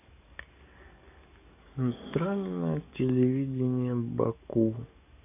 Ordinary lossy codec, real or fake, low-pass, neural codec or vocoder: none; fake; 3.6 kHz; codec, 44.1 kHz, 7.8 kbps, DAC